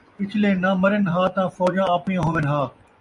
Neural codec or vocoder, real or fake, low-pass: vocoder, 24 kHz, 100 mel bands, Vocos; fake; 10.8 kHz